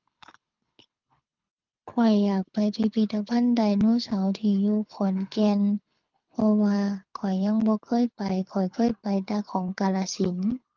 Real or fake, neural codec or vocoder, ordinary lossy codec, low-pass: fake; codec, 24 kHz, 6 kbps, HILCodec; Opus, 24 kbps; 7.2 kHz